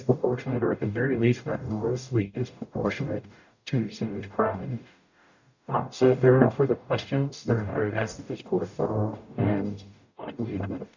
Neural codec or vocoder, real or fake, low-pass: codec, 44.1 kHz, 0.9 kbps, DAC; fake; 7.2 kHz